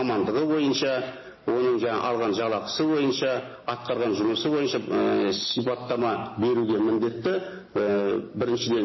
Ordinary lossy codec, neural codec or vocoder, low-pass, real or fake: MP3, 24 kbps; none; 7.2 kHz; real